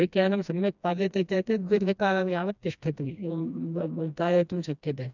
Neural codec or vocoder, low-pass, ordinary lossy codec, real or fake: codec, 16 kHz, 1 kbps, FreqCodec, smaller model; 7.2 kHz; none; fake